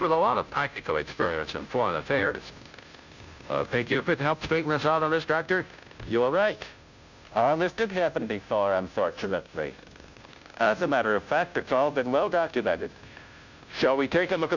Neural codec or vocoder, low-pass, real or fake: codec, 16 kHz, 0.5 kbps, FunCodec, trained on Chinese and English, 25 frames a second; 7.2 kHz; fake